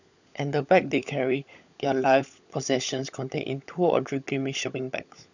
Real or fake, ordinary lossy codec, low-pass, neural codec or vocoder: fake; none; 7.2 kHz; codec, 16 kHz, 16 kbps, FunCodec, trained on LibriTTS, 50 frames a second